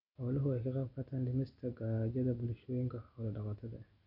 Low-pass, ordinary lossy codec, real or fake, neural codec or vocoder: 5.4 kHz; none; real; none